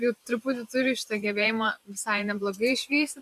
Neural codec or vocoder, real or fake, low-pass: vocoder, 44.1 kHz, 128 mel bands every 512 samples, BigVGAN v2; fake; 14.4 kHz